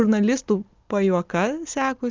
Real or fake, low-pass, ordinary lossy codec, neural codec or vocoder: real; 7.2 kHz; Opus, 24 kbps; none